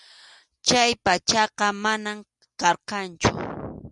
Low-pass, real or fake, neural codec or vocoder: 10.8 kHz; real; none